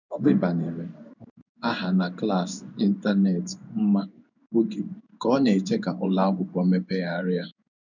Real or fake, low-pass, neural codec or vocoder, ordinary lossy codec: fake; 7.2 kHz; codec, 16 kHz in and 24 kHz out, 1 kbps, XY-Tokenizer; none